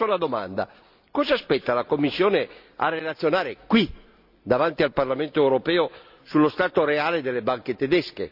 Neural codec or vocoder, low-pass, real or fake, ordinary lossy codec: none; 5.4 kHz; real; none